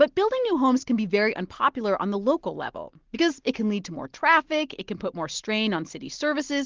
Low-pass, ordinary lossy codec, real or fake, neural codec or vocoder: 7.2 kHz; Opus, 16 kbps; real; none